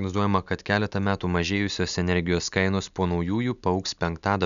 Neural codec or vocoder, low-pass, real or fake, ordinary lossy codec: none; 7.2 kHz; real; MP3, 96 kbps